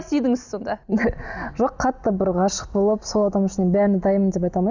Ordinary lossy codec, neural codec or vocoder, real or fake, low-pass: none; none; real; 7.2 kHz